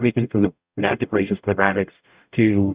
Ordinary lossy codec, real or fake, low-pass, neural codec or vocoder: Opus, 64 kbps; fake; 3.6 kHz; codec, 44.1 kHz, 0.9 kbps, DAC